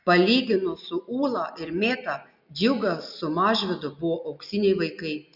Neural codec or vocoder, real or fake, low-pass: none; real; 5.4 kHz